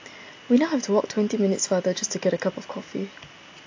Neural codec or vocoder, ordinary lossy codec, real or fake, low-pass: none; AAC, 48 kbps; real; 7.2 kHz